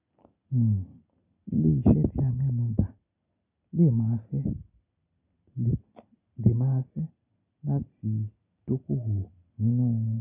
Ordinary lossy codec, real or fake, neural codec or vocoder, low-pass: none; real; none; 3.6 kHz